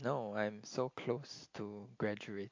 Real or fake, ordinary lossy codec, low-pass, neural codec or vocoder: real; MP3, 48 kbps; 7.2 kHz; none